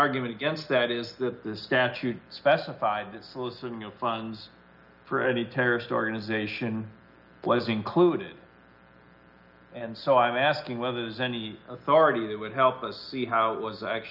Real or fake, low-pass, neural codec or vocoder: real; 5.4 kHz; none